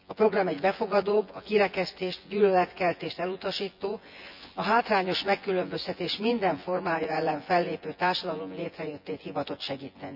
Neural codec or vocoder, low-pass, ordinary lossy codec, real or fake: vocoder, 24 kHz, 100 mel bands, Vocos; 5.4 kHz; none; fake